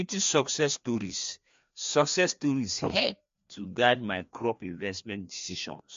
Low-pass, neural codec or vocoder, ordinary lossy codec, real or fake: 7.2 kHz; codec, 16 kHz, 2 kbps, FreqCodec, larger model; MP3, 48 kbps; fake